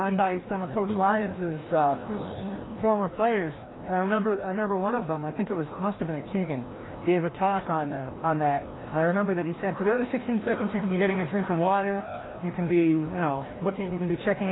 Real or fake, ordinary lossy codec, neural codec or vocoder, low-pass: fake; AAC, 16 kbps; codec, 16 kHz, 1 kbps, FreqCodec, larger model; 7.2 kHz